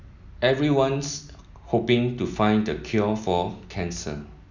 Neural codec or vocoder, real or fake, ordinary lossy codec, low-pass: none; real; none; 7.2 kHz